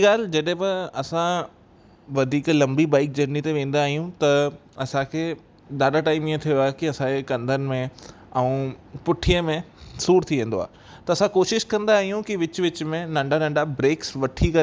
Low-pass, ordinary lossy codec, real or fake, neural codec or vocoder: none; none; real; none